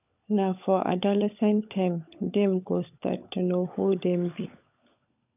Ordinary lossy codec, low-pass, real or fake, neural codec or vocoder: none; 3.6 kHz; fake; codec, 16 kHz, 16 kbps, FunCodec, trained on LibriTTS, 50 frames a second